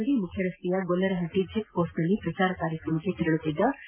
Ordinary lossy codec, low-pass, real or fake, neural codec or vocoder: MP3, 24 kbps; 3.6 kHz; real; none